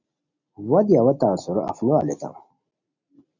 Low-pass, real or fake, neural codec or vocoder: 7.2 kHz; real; none